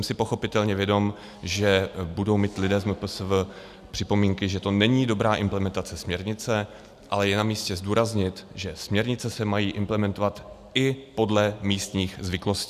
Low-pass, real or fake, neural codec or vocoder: 14.4 kHz; real; none